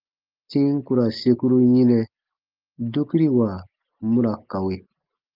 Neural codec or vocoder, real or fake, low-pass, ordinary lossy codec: none; real; 5.4 kHz; Opus, 32 kbps